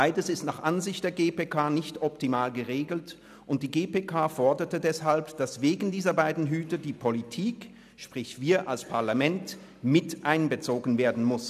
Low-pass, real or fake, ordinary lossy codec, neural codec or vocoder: 14.4 kHz; real; none; none